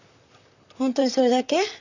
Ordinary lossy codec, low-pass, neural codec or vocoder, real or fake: none; 7.2 kHz; vocoder, 44.1 kHz, 128 mel bands, Pupu-Vocoder; fake